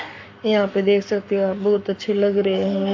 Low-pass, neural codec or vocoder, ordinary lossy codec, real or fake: 7.2 kHz; codec, 44.1 kHz, 7.8 kbps, Pupu-Codec; AAC, 48 kbps; fake